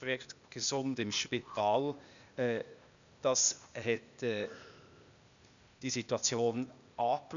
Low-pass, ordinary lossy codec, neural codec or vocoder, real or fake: 7.2 kHz; none; codec, 16 kHz, 0.8 kbps, ZipCodec; fake